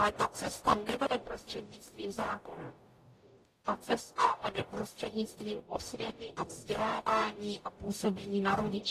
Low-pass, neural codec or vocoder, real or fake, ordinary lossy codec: 14.4 kHz; codec, 44.1 kHz, 0.9 kbps, DAC; fake; AAC, 48 kbps